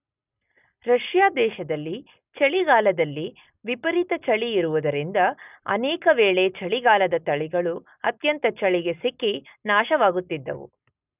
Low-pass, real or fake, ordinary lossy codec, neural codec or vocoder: 3.6 kHz; real; none; none